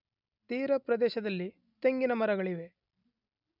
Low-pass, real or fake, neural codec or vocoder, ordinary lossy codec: 5.4 kHz; real; none; none